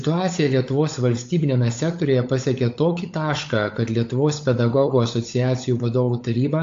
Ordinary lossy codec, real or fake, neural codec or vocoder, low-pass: AAC, 48 kbps; fake; codec, 16 kHz, 16 kbps, FunCodec, trained on LibriTTS, 50 frames a second; 7.2 kHz